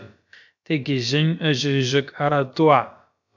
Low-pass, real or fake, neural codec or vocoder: 7.2 kHz; fake; codec, 16 kHz, about 1 kbps, DyCAST, with the encoder's durations